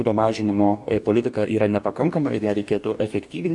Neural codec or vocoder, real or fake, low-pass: codec, 44.1 kHz, 2.6 kbps, DAC; fake; 10.8 kHz